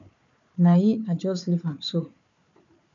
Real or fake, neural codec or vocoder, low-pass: fake; codec, 16 kHz, 4 kbps, FunCodec, trained on Chinese and English, 50 frames a second; 7.2 kHz